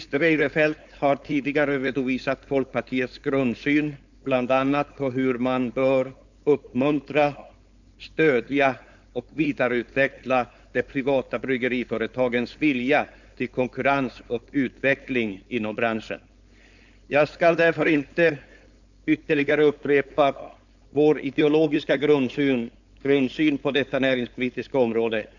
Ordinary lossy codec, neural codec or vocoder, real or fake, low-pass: none; codec, 16 kHz, 4.8 kbps, FACodec; fake; 7.2 kHz